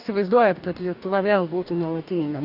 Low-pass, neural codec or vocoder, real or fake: 5.4 kHz; codec, 44.1 kHz, 2.6 kbps, DAC; fake